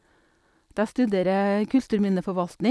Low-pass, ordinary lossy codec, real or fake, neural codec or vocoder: none; none; real; none